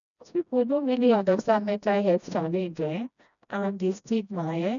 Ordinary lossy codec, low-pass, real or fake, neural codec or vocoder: none; 7.2 kHz; fake; codec, 16 kHz, 1 kbps, FreqCodec, smaller model